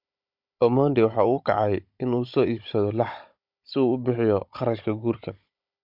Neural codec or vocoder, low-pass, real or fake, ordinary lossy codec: codec, 16 kHz, 16 kbps, FunCodec, trained on Chinese and English, 50 frames a second; 5.4 kHz; fake; MP3, 48 kbps